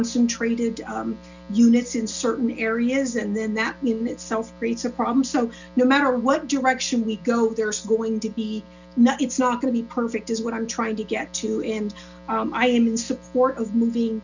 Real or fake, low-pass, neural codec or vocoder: real; 7.2 kHz; none